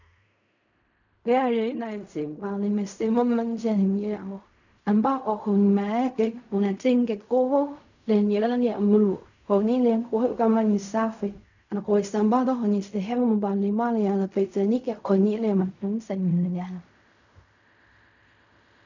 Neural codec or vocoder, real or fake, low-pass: codec, 16 kHz in and 24 kHz out, 0.4 kbps, LongCat-Audio-Codec, fine tuned four codebook decoder; fake; 7.2 kHz